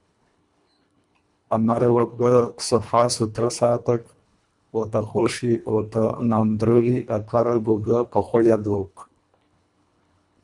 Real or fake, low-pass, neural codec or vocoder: fake; 10.8 kHz; codec, 24 kHz, 1.5 kbps, HILCodec